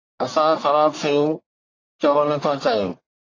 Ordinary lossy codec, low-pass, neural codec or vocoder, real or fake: AAC, 32 kbps; 7.2 kHz; codec, 44.1 kHz, 1.7 kbps, Pupu-Codec; fake